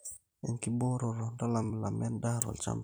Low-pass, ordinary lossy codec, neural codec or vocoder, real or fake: none; none; vocoder, 44.1 kHz, 128 mel bands every 256 samples, BigVGAN v2; fake